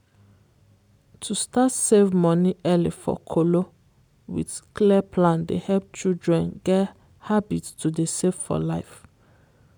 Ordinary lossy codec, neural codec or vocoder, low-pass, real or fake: none; none; none; real